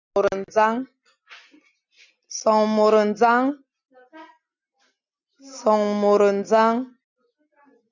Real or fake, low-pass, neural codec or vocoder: real; 7.2 kHz; none